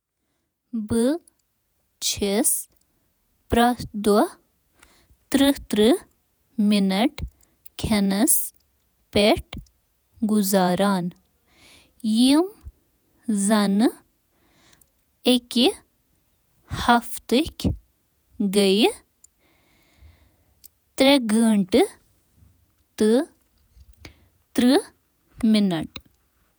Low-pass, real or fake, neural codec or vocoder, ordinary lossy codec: none; fake; vocoder, 48 kHz, 128 mel bands, Vocos; none